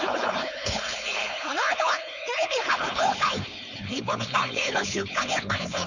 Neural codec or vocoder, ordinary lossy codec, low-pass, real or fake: codec, 16 kHz, 4.8 kbps, FACodec; none; 7.2 kHz; fake